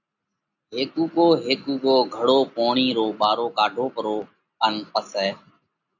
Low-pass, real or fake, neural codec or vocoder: 7.2 kHz; real; none